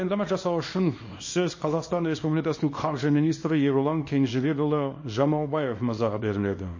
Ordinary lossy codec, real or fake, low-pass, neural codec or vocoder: MP3, 32 kbps; fake; 7.2 kHz; codec, 24 kHz, 0.9 kbps, WavTokenizer, small release